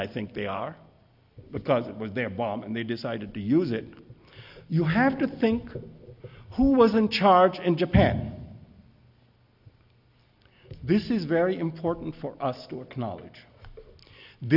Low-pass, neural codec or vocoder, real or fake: 5.4 kHz; none; real